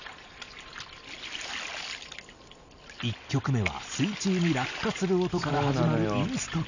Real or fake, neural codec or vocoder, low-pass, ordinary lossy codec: real; none; 7.2 kHz; none